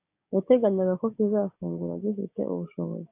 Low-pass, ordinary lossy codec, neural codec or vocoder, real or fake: 3.6 kHz; AAC, 32 kbps; codec, 16 kHz, 6 kbps, DAC; fake